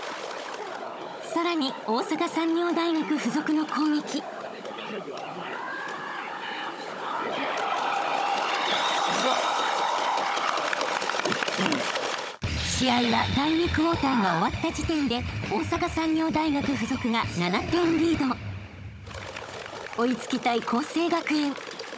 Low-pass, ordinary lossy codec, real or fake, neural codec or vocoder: none; none; fake; codec, 16 kHz, 16 kbps, FunCodec, trained on Chinese and English, 50 frames a second